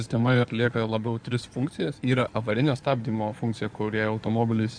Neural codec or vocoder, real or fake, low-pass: codec, 16 kHz in and 24 kHz out, 2.2 kbps, FireRedTTS-2 codec; fake; 9.9 kHz